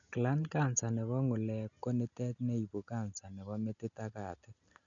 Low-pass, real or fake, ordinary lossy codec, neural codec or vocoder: 7.2 kHz; real; none; none